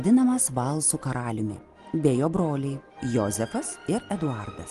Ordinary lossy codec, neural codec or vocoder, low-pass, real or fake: Opus, 24 kbps; none; 10.8 kHz; real